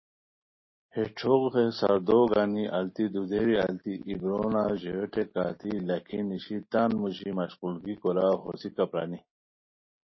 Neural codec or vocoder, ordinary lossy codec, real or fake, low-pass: none; MP3, 24 kbps; real; 7.2 kHz